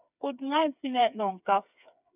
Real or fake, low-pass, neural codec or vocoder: fake; 3.6 kHz; codec, 16 kHz, 4 kbps, FreqCodec, smaller model